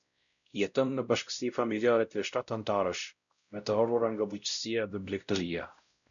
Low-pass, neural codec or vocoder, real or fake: 7.2 kHz; codec, 16 kHz, 0.5 kbps, X-Codec, WavLM features, trained on Multilingual LibriSpeech; fake